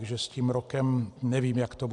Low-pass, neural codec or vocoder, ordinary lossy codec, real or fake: 10.8 kHz; none; AAC, 64 kbps; real